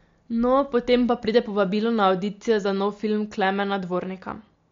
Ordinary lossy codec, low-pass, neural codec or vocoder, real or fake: MP3, 48 kbps; 7.2 kHz; none; real